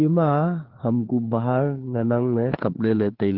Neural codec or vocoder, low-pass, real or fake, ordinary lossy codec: codec, 16 kHz, 4 kbps, FreqCodec, larger model; 5.4 kHz; fake; Opus, 16 kbps